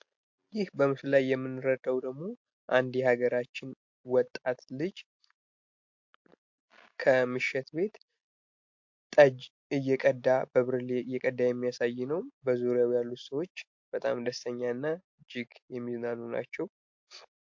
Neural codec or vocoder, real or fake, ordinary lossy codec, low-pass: none; real; MP3, 48 kbps; 7.2 kHz